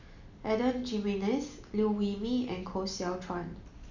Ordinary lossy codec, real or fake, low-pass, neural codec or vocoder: none; real; 7.2 kHz; none